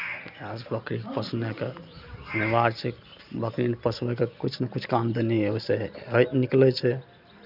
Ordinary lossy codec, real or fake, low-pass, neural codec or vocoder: none; real; 5.4 kHz; none